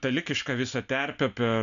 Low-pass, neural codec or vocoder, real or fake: 7.2 kHz; none; real